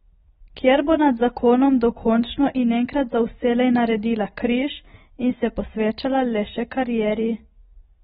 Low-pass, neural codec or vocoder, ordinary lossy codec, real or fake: 19.8 kHz; none; AAC, 16 kbps; real